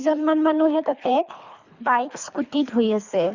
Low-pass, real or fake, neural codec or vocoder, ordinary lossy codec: 7.2 kHz; fake; codec, 24 kHz, 3 kbps, HILCodec; Opus, 64 kbps